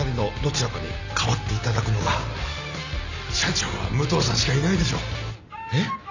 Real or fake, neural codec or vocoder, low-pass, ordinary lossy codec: real; none; 7.2 kHz; none